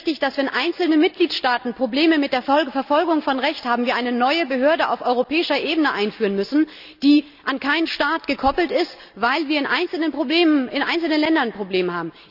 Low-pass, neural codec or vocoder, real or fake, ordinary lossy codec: 5.4 kHz; none; real; none